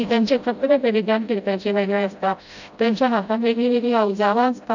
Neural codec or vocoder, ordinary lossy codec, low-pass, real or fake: codec, 16 kHz, 0.5 kbps, FreqCodec, smaller model; none; 7.2 kHz; fake